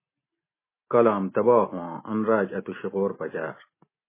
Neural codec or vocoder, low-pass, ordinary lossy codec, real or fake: none; 3.6 kHz; MP3, 16 kbps; real